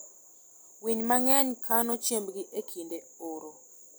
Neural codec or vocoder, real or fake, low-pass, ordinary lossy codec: none; real; none; none